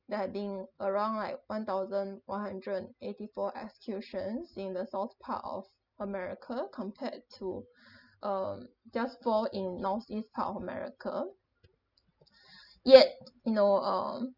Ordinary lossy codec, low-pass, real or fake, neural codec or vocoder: none; 5.4 kHz; real; none